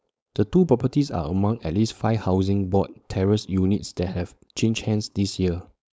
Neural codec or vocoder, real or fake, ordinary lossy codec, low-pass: codec, 16 kHz, 4.8 kbps, FACodec; fake; none; none